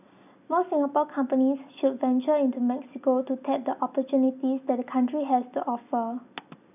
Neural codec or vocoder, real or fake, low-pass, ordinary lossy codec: none; real; 3.6 kHz; none